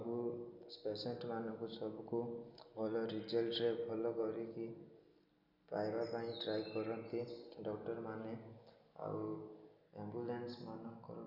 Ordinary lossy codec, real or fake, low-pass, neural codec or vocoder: none; real; 5.4 kHz; none